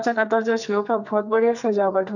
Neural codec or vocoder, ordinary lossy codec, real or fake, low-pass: codec, 44.1 kHz, 2.6 kbps, SNAC; none; fake; 7.2 kHz